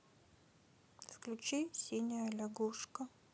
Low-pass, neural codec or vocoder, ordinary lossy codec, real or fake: none; none; none; real